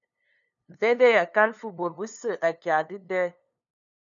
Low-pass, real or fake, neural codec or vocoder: 7.2 kHz; fake; codec, 16 kHz, 2 kbps, FunCodec, trained on LibriTTS, 25 frames a second